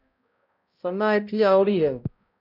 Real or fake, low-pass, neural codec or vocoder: fake; 5.4 kHz; codec, 16 kHz, 0.5 kbps, X-Codec, HuBERT features, trained on balanced general audio